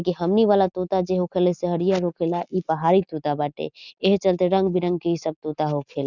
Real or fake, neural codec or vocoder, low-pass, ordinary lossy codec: real; none; 7.2 kHz; none